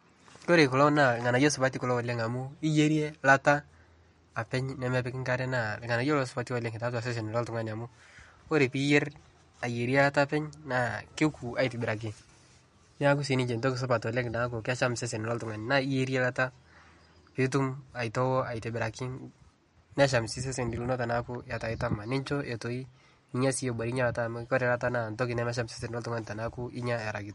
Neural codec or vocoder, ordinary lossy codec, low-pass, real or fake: none; MP3, 48 kbps; 19.8 kHz; real